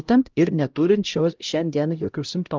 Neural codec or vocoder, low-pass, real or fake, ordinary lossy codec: codec, 16 kHz, 0.5 kbps, X-Codec, HuBERT features, trained on LibriSpeech; 7.2 kHz; fake; Opus, 32 kbps